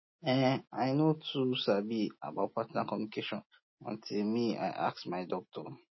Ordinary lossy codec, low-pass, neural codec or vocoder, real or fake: MP3, 24 kbps; 7.2 kHz; none; real